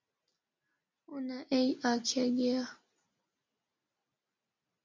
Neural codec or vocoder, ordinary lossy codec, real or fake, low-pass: none; MP3, 48 kbps; real; 7.2 kHz